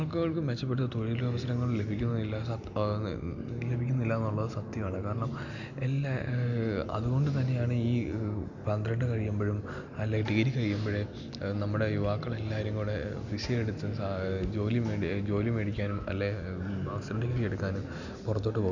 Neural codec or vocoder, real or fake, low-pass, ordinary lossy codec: none; real; 7.2 kHz; none